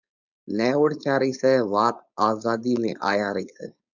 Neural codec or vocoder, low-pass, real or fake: codec, 16 kHz, 4.8 kbps, FACodec; 7.2 kHz; fake